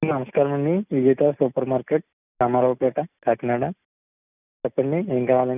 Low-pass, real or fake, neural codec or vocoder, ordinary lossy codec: 3.6 kHz; real; none; none